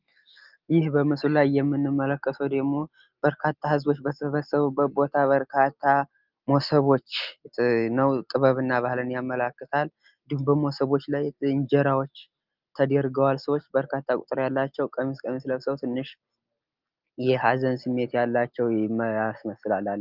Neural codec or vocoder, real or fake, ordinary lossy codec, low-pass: none; real; Opus, 32 kbps; 5.4 kHz